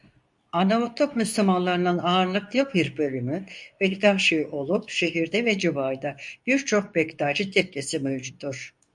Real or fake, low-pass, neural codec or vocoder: fake; 10.8 kHz; codec, 24 kHz, 0.9 kbps, WavTokenizer, medium speech release version 2